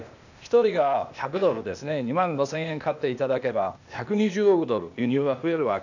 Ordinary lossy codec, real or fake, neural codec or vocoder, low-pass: none; fake; codec, 16 kHz, 0.8 kbps, ZipCodec; 7.2 kHz